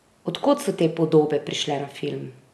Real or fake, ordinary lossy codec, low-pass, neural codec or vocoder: real; none; none; none